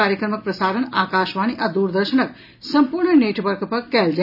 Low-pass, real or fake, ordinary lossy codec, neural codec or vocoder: 5.4 kHz; real; none; none